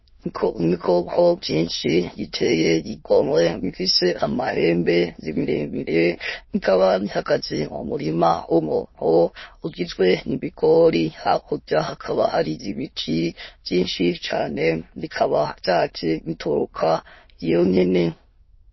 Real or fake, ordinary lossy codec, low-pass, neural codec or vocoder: fake; MP3, 24 kbps; 7.2 kHz; autoencoder, 22.05 kHz, a latent of 192 numbers a frame, VITS, trained on many speakers